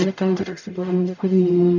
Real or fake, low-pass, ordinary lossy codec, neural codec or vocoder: fake; 7.2 kHz; none; codec, 44.1 kHz, 0.9 kbps, DAC